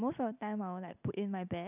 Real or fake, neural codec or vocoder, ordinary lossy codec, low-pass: real; none; none; 3.6 kHz